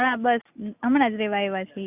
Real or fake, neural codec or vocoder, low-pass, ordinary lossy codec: real; none; 3.6 kHz; Opus, 64 kbps